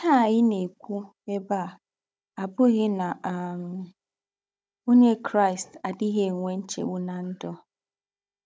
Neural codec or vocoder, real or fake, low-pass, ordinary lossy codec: codec, 16 kHz, 16 kbps, FunCodec, trained on Chinese and English, 50 frames a second; fake; none; none